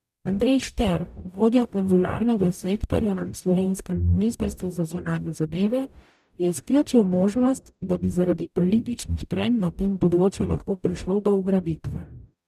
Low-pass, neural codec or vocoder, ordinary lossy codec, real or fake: 14.4 kHz; codec, 44.1 kHz, 0.9 kbps, DAC; none; fake